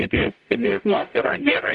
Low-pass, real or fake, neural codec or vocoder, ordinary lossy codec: 10.8 kHz; fake; codec, 44.1 kHz, 0.9 kbps, DAC; Opus, 64 kbps